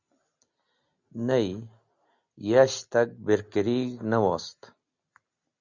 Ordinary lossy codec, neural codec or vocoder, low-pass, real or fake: Opus, 64 kbps; none; 7.2 kHz; real